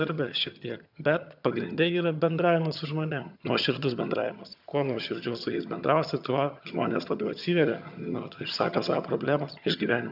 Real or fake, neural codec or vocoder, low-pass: fake; vocoder, 22.05 kHz, 80 mel bands, HiFi-GAN; 5.4 kHz